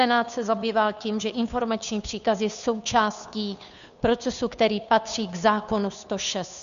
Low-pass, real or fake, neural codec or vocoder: 7.2 kHz; fake; codec, 16 kHz, 2 kbps, FunCodec, trained on Chinese and English, 25 frames a second